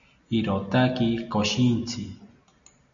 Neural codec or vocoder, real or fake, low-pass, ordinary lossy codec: none; real; 7.2 kHz; MP3, 96 kbps